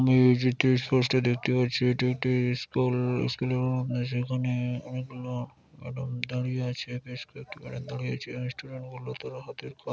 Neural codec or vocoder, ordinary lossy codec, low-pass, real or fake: none; none; none; real